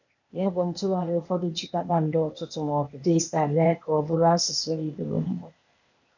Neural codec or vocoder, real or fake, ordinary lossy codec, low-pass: codec, 16 kHz, 0.8 kbps, ZipCodec; fake; none; 7.2 kHz